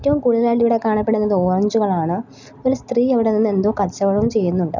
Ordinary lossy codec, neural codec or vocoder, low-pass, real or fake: none; none; 7.2 kHz; real